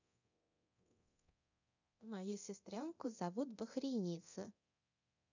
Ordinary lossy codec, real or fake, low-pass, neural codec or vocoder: none; fake; 7.2 kHz; codec, 24 kHz, 0.9 kbps, DualCodec